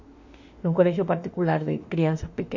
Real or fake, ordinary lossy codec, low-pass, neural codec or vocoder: fake; MP3, 48 kbps; 7.2 kHz; autoencoder, 48 kHz, 32 numbers a frame, DAC-VAE, trained on Japanese speech